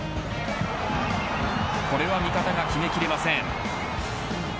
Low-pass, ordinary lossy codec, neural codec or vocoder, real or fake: none; none; none; real